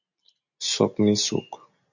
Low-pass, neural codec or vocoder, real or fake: 7.2 kHz; none; real